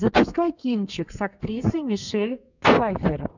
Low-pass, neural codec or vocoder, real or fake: 7.2 kHz; codec, 32 kHz, 1.9 kbps, SNAC; fake